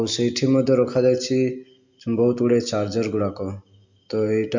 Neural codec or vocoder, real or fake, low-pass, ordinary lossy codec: none; real; 7.2 kHz; MP3, 48 kbps